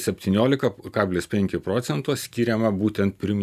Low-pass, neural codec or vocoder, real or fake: 14.4 kHz; none; real